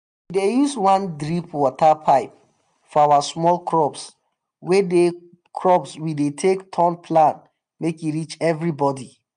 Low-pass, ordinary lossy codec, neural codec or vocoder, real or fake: 9.9 kHz; MP3, 96 kbps; none; real